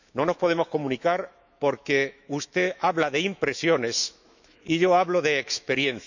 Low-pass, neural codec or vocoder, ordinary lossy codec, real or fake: 7.2 kHz; autoencoder, 48 kHz, 128 numbers a frame, DAC-VAE, trained on Japanese speech; none; fake